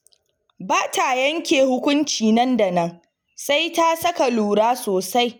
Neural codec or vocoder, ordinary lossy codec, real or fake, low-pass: none; none; real; none